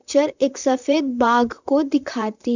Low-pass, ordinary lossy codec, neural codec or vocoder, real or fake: 7.2 kHz; none; vocoder, 44.1 kHz, 128 mel bands, Pupu-Vocoder; fake